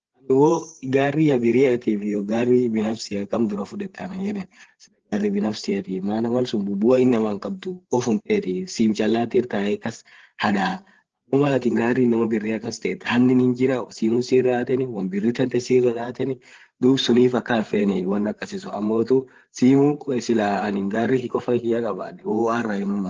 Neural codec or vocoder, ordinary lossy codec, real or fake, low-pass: codec, 16 kHz, 8 kbps, FreqCodec, larger model; Opus, 16 kbps; fake; 7.2 kHz